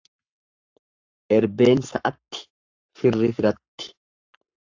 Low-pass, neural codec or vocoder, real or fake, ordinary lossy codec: 7.2 kHz; codec, 44.1 kHz, 7.8 kbps, Pupu-Codec; fake; AAC, 48 kbps